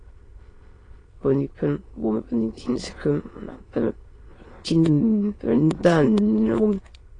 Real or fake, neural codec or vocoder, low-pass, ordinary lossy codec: fake; autoencoder, 22.05 kHz, a latent of 192 numbers a frame, VITS, trained on many speakers; 9.9 kHz; AAC, 32 kbps